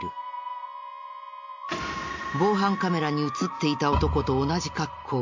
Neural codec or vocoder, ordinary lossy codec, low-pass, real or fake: none; MP3, 64 kbps; 7.2 kHz; real